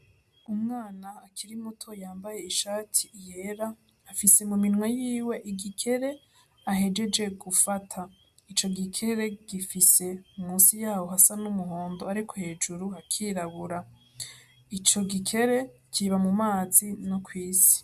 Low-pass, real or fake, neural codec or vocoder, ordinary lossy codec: 14.4 kHz; real; none; MP3, 96 kbps